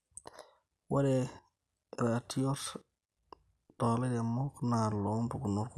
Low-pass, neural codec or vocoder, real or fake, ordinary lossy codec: none; none; real; none